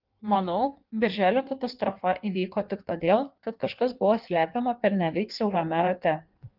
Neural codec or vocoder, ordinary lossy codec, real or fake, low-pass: codec, 16 kHz in and 24 kHz out, 1.1 kbps, FireRedTTS-2 codec; Opus, 32 kbps; fake; 5.4 kHz